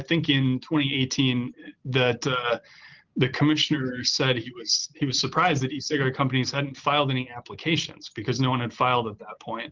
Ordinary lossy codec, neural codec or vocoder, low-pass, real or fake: Opus, 16 kbps; none; 7.2 kHz; real